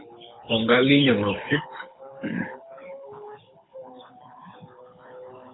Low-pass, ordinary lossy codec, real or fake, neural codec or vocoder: 7.2 kHz; AAC, 16 kbps; fake; codec, 24 kHz, 6 kbps, HILCodec